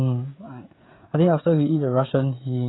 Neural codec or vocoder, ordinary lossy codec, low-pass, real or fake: codec, 16 kHz, 16 kbps, FreqCodec, smaller model; AAC, 16 kbps; 7.2 kHz; fake